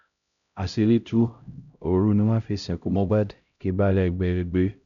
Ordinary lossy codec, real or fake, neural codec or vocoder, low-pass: MP3, 96 kbps; fake; codec, 16 kHz, 0.5 kbps, X-Codec, HuBERT features, trained on LibriSpeech; 7.2 kHz